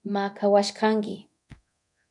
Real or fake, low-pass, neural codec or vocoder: fake; 10.8 kHz; codec, 24 kHz, 0.9 kbps, DualCodec